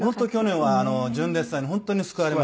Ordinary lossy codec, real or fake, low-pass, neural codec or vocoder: none; real; none; none